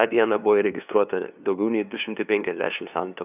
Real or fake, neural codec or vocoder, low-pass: fake; codec, 16 kHz, 2 kbps, FunCodec, trained on LibriTTS, 25 frames a second; 3.6 kHz